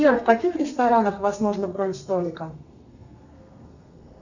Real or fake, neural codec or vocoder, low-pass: fake; codec, 32 kHz, 1.9 kbps, SNAC; 7.2 kHz